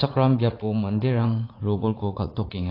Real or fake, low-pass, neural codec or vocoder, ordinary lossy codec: fake; 5.4 kHz; vocoder, 44.1 kHz, 80 mel bands, Vocos; AAC, 32 kbps